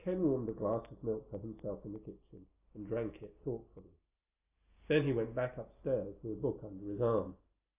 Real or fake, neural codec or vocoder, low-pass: real; none; 3.6 kHz